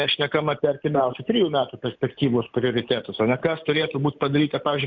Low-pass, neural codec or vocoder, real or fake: 7.2 kHz; none; real